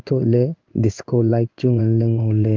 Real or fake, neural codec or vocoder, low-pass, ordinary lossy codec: fake; codec, 16 kHz in and 24 kHz out, 1 kbps, XY-Tokenizer; 7.2 kHz; Opus, 24 kbps